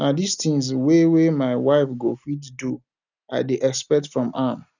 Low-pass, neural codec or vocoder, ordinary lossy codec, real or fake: 7.2 kHz; none; none; real